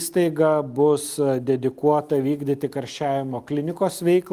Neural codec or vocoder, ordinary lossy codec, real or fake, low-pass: none; Opus, 32 kbps; real; 14.4 kHz